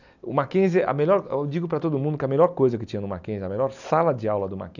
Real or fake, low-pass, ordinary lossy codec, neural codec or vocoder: real; 7.2 kHz; none; none